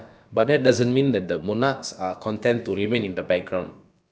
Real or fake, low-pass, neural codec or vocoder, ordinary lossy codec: fake; none; codec, 16 kHz, about 1 kbps, DyCAST, with the encoder's durations; none